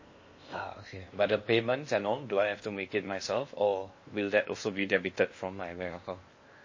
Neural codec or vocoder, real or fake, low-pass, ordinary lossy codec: codec, 16 kHz in and 24 kHz out, 0.8 kbps, FocalCodec, streaming, 65536 codes; fake; 7.2 kHz; MP3, 32 kbps